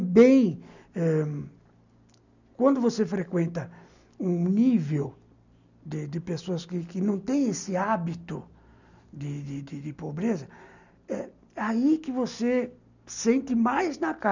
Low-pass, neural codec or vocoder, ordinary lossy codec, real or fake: 7.2 kHz; none; none; real